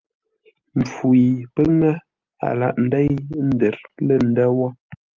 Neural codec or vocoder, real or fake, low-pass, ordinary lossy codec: none; real; 7.2 kHz; Opus, 24 kbps